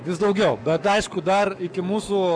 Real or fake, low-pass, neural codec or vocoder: fake; 9.9 kHz; codec, 44.1 kHz, 7.8 kbps, Pupu-Codec